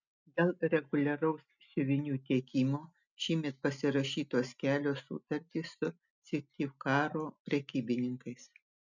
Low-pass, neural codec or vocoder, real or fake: 7.2 kHz; none; real